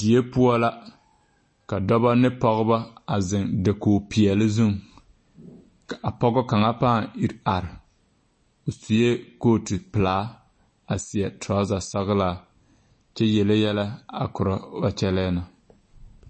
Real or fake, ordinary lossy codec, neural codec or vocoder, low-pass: real; MP3, 32 kbps; none; 9.9 kHz